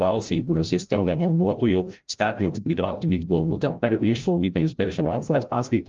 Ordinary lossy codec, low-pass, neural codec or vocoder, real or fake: Opus, 24 kbps; 7.2 kHz; codec, 16 kHz, 0.5 kbps, FreqCodec, larger model; fake